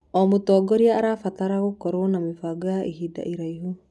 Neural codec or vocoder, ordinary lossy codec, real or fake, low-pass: none; none; real; none